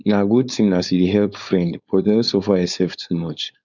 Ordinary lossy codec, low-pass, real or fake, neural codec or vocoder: none; 7.2 kHz; fake; codec, 16 kHz, 4.8 kbps, FACodec